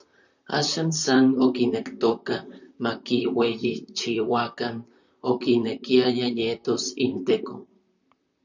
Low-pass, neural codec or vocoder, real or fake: 7.2 kHz; vocoder, 44.1 kHz, 128 mel bands, Pupu-Vocoder; fake